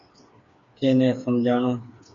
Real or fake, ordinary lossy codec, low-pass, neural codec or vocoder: fake; AAC, 64 kbps; 7.2 kHz; codec, 16 kHz, 4 kbps, FreqCodec, smaller model